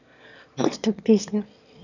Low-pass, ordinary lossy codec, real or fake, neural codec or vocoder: 7.2 kHz; none; fake; autoencoder, 22.05 kHz, a latent of 192 numbers a frame, VITS, trained on one speaker